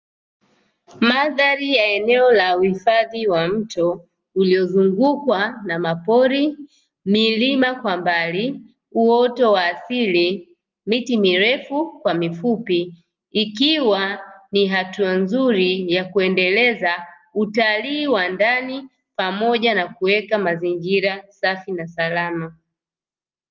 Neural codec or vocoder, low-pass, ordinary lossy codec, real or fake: none; 7.2 kHz; Opus, 24 kbps; real